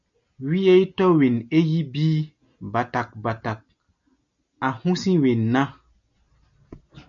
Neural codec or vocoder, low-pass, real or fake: none; 7.2 kHz; real